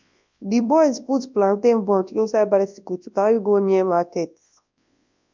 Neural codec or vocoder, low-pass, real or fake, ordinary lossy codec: codec, 24 kHz, 0.9 kbps, WavTokenizer, large speech release; 7.2 kHz; fake; MP3, 64 kbps